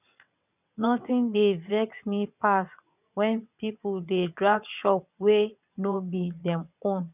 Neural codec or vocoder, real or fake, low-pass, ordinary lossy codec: vocoder, 22.05 kHz, 80 mel bands, WaveNeXt; fake; 3.6 kHz; none